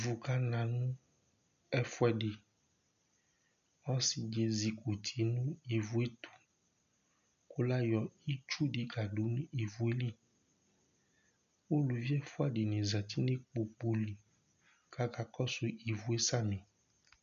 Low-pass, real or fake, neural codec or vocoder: 7.2 kHz; real; none